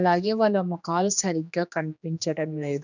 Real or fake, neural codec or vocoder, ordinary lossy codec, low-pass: fake; codec, 16 kHz, 2 kbps, X-Codec, HuBERT features, trained on general audio; none; 7.2 kHz